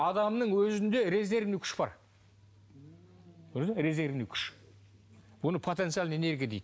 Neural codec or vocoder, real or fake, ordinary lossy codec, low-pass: none; real; none; none